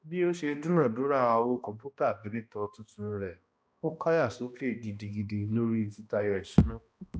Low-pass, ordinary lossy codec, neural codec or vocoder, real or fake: none; none; codec, 16 kHz, 1 kbps, X-Codec, HuBERT features, trained on balanced general audio; fake